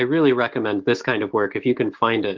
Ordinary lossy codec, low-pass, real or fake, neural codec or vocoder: Opus, 16 kbps; 7.2 kHz; fake; autoencoder, 48 kHz, 128 numbers a frame, DAC-VAE, trained on Japanese speech